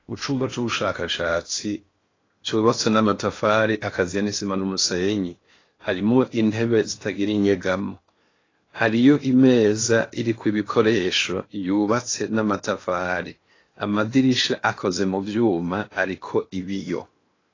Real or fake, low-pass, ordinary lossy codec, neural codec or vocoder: fake; 7.2 kHz; AAC, 32 kbps; codec, 16 kHz in and 24 kHz out, 0.8 kbps, FocalCodec, streaming, 65536 codes